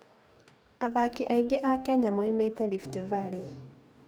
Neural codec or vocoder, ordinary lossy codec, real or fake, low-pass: codec, 44.1 kHz, 2.6 kbps, DAC; none; fake; none